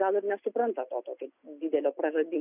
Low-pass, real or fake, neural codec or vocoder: 3.6 kHz; real; none